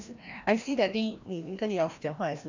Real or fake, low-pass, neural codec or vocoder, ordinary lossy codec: fake; 7.2 kHz; codec, 16 kHz, 1 kbps, FreqCodec, larger model; none